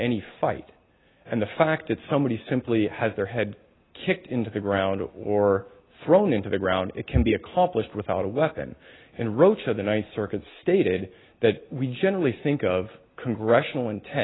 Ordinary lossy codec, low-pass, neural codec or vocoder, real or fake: AAC, 16 kbps; 7.2 kHz; none; real